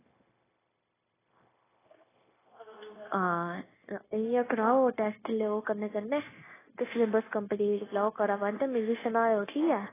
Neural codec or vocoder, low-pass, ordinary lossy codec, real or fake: codec, 16 kHz, 0.9 kbps, LongCat-Audio-Codec; 3.6 kHz; AAC, 16 kbps; fake